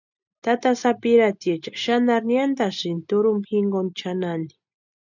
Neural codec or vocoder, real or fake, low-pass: none; real; 7.2 kHz